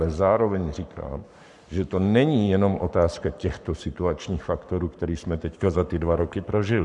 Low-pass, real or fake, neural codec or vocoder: 10.8 kHz; fake; codec, 44.1 kHz, 7.8 kbps, Pupu-Codec